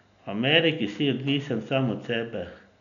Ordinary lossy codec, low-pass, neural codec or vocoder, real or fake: none; 7.2 kHz; none; real